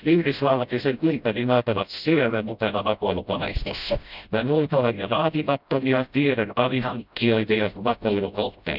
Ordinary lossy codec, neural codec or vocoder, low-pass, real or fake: none; codec, 16 kHz, 0.5 kbps, FreqCodec, smaller model; 5.4 kHz; fake